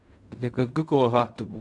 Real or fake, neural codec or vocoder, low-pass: fake; codec, 16 kHz in and 24 kHz out, 0.4 kbps, LongCat-Audio-Codec, fine tuned four codebook decoder; 10.8 kHz